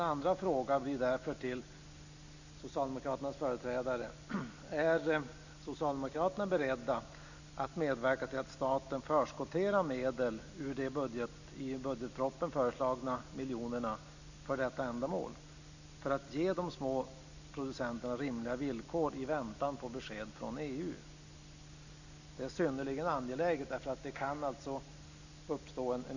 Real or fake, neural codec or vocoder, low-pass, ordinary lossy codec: real; none; 7.2 kHz; none